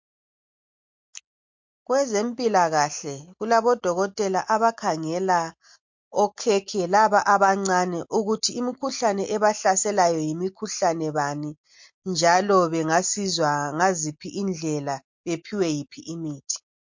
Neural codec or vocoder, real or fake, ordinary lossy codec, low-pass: none; real; MP3, 48 kbps; 7.2 kHz